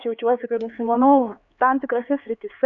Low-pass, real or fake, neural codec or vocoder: 7.2 kHz; fake; codec, 16 kHz, 4 kbps, X-Codec, HuBERT features, trained on LibriSpeech